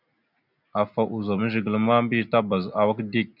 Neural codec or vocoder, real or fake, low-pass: none; real; 5.4 kHz